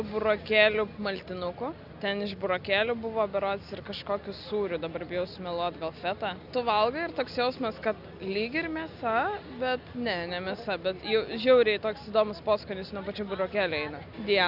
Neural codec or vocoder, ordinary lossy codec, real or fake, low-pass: none; Opus, 64 kbps; real; 5.4 kHz